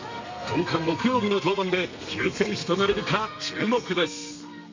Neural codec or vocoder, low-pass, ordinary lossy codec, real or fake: codec, 32 kHz, 1.9 kbps, SNAC; 7.2 kHz; MP3, 64 kbps; fake